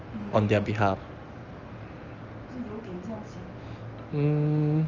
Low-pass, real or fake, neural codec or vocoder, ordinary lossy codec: 7.2 kHz; real; none; Opus, 24 kbps